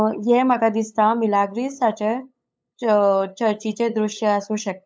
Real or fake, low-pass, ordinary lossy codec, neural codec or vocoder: fake; none; none; codec, 16 kHz, 8 kbps, FunCodec, trained on LibriTTS, 25 frames a second